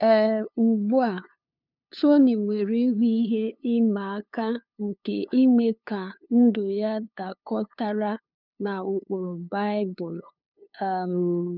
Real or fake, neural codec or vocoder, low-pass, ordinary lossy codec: fake; codec, 16 kHz, 2 kbps, FunCodec, trained on LibriTTS, 25 frames a second; 5.4 kHz; none